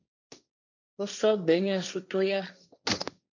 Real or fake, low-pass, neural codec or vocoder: fake; 7.2 kHz; codec, 16 kHz, 1.1 kbps, Voila-Tokenizer